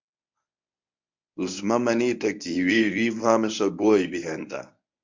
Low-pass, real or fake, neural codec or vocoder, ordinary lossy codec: 7.2 kHz; fake; codec, 24 kHz, 0.9 kbps, WavTokenizer, medium speech release version 1; MP3, 64 kbps